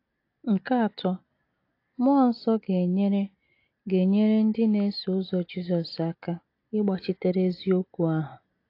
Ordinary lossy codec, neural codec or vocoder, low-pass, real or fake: AAC, 32 kbps; none; 5.4 kHz; real